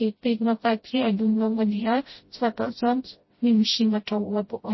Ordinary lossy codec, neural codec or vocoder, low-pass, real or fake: MP3, 24 kbps; codec, 16 kHz, 0.5 kbps, FreqCodec, smaller model; 7.2 kHz; fake